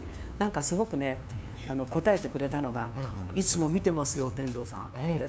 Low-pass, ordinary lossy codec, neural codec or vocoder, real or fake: none; none; codec, 16 kHz, 2 kbps, FunCodec, trained on LibriTTS, 25 frames a second; fake